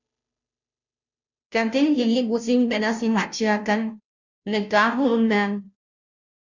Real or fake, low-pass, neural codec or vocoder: fake; 7.2 kHz; codec, 16 kHz, 0.5 kbps, FunCodec, trained on Chinese and English, 25 frames a second